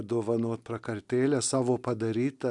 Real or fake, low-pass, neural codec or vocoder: real; 10.8 kHz; none